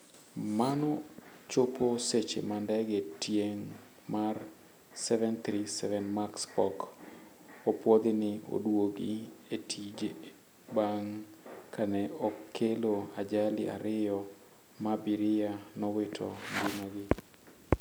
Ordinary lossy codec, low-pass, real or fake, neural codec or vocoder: none; none; real; none